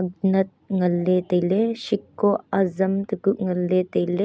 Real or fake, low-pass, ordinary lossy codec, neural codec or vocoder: real; none; none; none